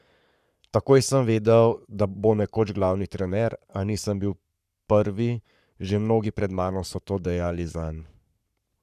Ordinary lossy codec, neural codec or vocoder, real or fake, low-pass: none; codec, 44.1 kHz, 7.8 kbps, Pupu-Codec; fake; 14.4 kHz